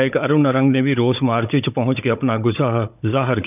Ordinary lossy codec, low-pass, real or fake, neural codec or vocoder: none; 3.6 kHz; fake; codec, 16 kHz, 16 kbps, FunCodec, trained on Chinese and English, 50 frames a second